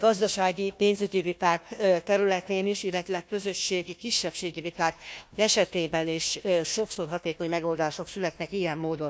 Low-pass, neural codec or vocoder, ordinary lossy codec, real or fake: none; codec, 16 kHz, 1 kbps, FunCodec, trained on Chinese and English, 50 frames a second; none; fake